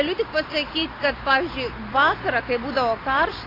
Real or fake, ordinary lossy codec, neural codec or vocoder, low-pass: real; AAC, 24 kbps; none; 5.4 kHz